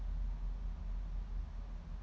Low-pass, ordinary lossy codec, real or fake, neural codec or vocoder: none; none; real; none